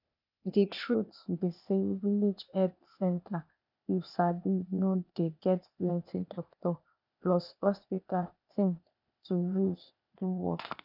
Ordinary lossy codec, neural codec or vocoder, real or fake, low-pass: none; codec, 16 kHz, 0.8 kbps, ZipCodec; fake; 5.4 kHz